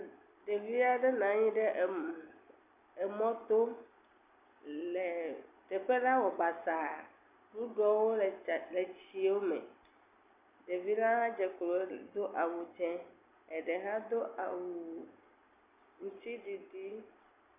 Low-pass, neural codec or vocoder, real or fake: 3.6 kHz; none; real